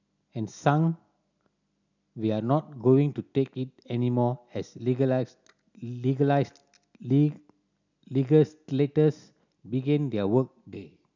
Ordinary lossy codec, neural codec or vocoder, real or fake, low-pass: none; none; real; 7.2 kHz